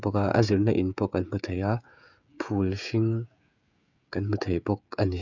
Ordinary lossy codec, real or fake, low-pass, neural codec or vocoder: none; fake; 7.2 kHz; codec, 16 kHz, 16 kbps, FreqCodec, smaller model